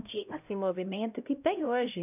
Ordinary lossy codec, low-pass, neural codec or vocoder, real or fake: none; 3.6 kHz; codec, 16 kHz, 0.5 kbps, X-Codec, HuBERT features, trained on LibriSpeech; fake